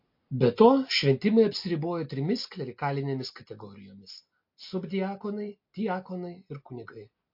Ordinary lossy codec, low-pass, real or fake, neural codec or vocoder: MP3, 32 kbps; 5.4 kHz; real; none